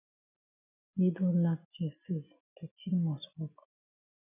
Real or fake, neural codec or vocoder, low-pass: real; none; 3.6 kHz